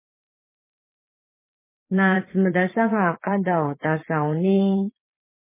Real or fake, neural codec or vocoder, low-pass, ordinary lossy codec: fake; vocoder, 22.05 kHz, 80 mel bands, Vocos; 3.6 kHz; MP3, 16 kbps